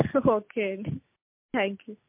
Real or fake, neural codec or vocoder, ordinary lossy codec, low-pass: real; none; MP3, 32 kbps; 3.6 kHz